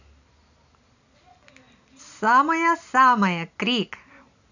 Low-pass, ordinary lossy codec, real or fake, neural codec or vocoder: 7.2 kHz; none; real; none